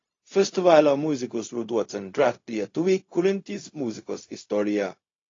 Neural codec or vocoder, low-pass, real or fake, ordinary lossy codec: codec, 16 kHz, 0.4 kbps, LongCat-Audio-Codec; 7.2 kHz; fake; AAC, 32 kbps